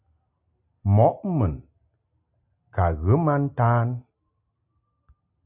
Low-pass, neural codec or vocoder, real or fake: 3.6 kHz; none; real